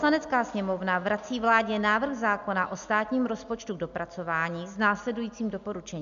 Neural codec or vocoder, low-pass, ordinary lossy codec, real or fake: none; 7.2 kHz; MP3, 64 kbps; real